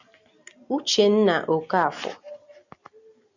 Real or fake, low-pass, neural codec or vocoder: real; 7.2 kHz; none